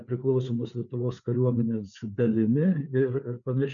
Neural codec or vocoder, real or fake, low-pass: codec, 16 kHz, 4 kbps, FreqCodec, larger model; fake; 7.2 kHz